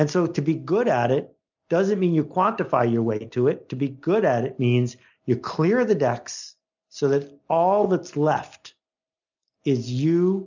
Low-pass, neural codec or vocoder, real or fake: 7.2 kHz; none; real